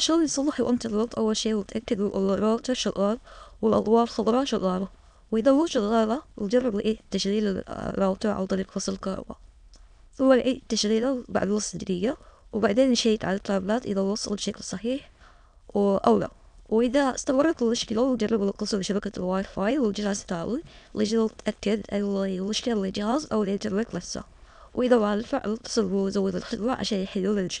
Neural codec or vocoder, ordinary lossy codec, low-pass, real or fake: autoencoder, 22.05 kHz, a latent of 192 numbers a frame, VITS, trained on many speakers; MP3, 96 kbps; 9.9 kHz; fake